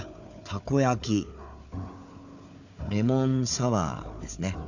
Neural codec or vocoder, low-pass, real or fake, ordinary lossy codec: codec, 16 kHz, 4 kbps, FunCodec, trained on Chinese and English, 50 frames a second; 7.2 kHz; fake; none